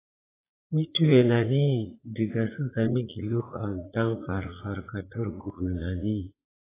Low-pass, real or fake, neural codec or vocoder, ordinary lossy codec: 3.6 kHz; fake; vocoder, 44.1 kHz, 80 mel bands, Vocos; AAC, 16 kbps